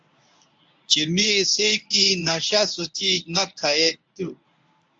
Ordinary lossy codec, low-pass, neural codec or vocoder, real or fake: AAC, 64 kbps; 9.9 kHz; codec, 24 kHz, 0.9 kbps, WavTokenizer, medium speech release version 1; fake